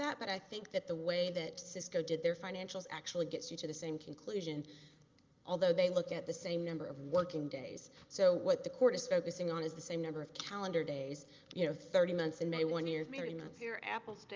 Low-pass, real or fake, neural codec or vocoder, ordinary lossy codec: 7.2 kHz; real; none; Opus, 24 kbps